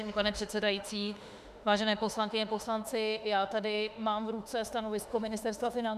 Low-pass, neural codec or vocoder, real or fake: 14.4 kHz; autoencoder, 48 kHz, 32 numbers a frame, DAC-VAE, trained on Japanese speech; fake